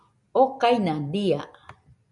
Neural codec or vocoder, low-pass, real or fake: none; 10.8 kHz; real